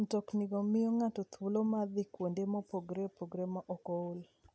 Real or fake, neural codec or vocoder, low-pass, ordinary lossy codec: real; none; none; none